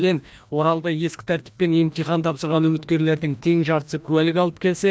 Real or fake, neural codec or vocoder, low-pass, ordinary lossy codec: fake; codec, 16 kHz, 1 kbps, FreqCodec, larger model; none; none